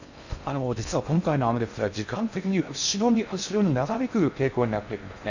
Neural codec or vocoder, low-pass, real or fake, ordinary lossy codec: codec, 16 kHz in and 24 kHz out, 0.6 kbps, FocalCodec, streaming, 4096 codes; 7.2 kHz; fake; none